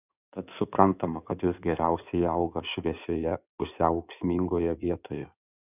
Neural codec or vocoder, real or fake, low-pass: codec, 16 kHz in and 24 kHz out, 2.2 kbps, FireRedTTS-2 codec; fake; 3.6 kHz